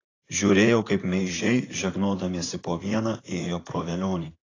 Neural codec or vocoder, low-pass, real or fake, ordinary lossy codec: vocoder, 44.1 kHz, 128 mel bands, Pupu-Vocoder; 7.2 kHz; fake; AAC, 32 kbps